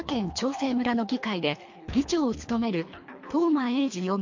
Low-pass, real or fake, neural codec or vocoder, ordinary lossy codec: 7.2 kHz; fake; codec, 24 kHz, 3 kbps, HILCodec; MP3, 48 kbps